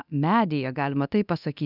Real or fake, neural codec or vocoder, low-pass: fake; codec, 24 kHz, 0.9 kbps, DualCodec; 5.4 kHz